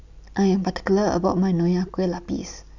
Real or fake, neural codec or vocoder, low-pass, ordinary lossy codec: real; none; 7.2 kHz; none